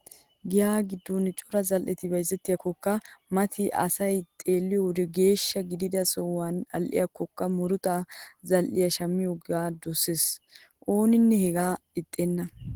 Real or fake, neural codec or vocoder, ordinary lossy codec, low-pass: real; none; Opus, 16 kbps; 19.8 kHz